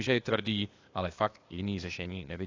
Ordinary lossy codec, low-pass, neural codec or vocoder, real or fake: AAC, 32 kbps; 7.2 kHz; codec, 16 kHz, 0.8 kbps, ZipCodec; fake